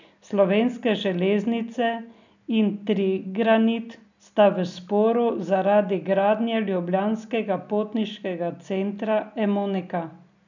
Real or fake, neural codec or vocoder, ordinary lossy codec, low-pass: real; none; none; 7.2 kHz